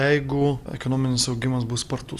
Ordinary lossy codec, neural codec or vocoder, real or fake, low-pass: Opus, 64 kbps; none; real; 14.4 kHz